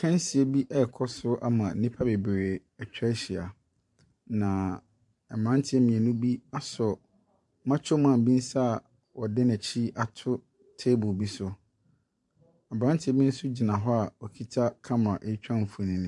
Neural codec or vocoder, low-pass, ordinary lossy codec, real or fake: vocoder, 44.1 kHz, 128 mel bands every 512 samples, BigVGAN v2; 10.8 kHz; MP3, 64 kbps; fake